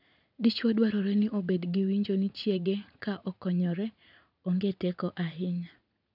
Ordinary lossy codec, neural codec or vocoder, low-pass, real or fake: none; vocoder, 44.1 kHz, 80 mel bands, Vocos; 5.4 kHz; fake